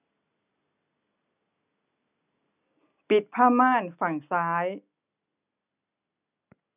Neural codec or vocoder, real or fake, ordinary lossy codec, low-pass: none; real; none; 3.6 kHz